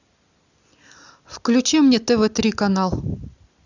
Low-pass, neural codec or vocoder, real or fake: 7.2 kHz; none; real